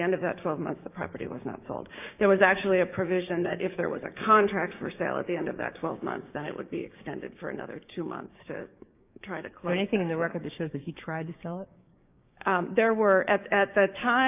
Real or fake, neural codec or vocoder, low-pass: fake; codec, 16 kHz, 6 kbps, DAC; 3.6 kHz